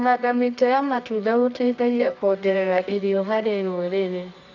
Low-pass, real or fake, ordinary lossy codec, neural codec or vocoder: 7.2 kHz; fake; none; codec, 24 kHz, 0.9 kbps, WavTokenizer, medium music audio release